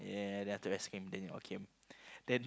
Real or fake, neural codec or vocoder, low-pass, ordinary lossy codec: real; none; none; none